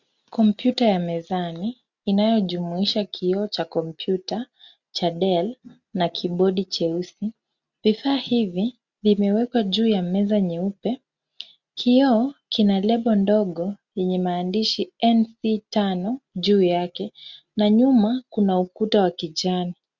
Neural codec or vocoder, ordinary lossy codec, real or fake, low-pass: none; Opus, 64 kbps; real; 7.2 kHz